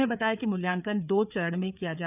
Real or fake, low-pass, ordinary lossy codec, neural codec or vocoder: fake; 3.6 kHz; none; codec, 16 kHz, 4 kbps, FreqCodec, larger model